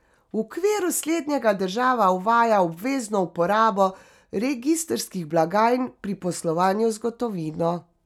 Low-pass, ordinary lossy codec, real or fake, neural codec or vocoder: 19.8 kHz; none; real; none